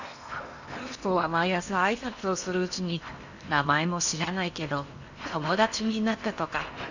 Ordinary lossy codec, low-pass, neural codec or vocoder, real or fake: none; 7.2 kHz; codec, 16 kHz in and 24 kHz out, 0.8 kbps, FocalCodec, streaming, 65536 codes; fake